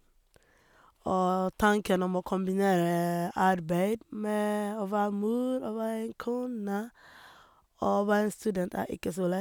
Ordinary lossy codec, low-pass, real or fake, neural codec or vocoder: none; none; real; none